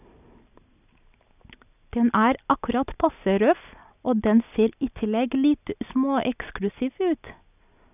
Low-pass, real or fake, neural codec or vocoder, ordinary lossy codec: 3.6 kHz; real; none; none